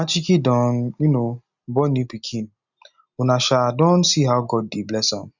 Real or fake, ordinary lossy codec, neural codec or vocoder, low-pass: real; none; none; 7.2 kHz